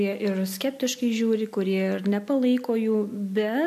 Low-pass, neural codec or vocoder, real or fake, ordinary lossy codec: 14.4 kHz; none; real; MP3, 64 kbps